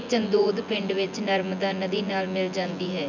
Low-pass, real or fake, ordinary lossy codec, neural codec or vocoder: 7.2 kHz; fake; none; vocoder, 24 kHz, 100 mel bands, Vocos